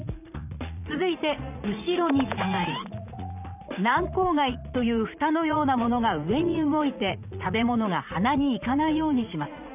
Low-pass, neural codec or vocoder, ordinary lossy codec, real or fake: 3.6 kHz; vocoder, 44.1 kHz, 80 mel bands, Vocos; none; fake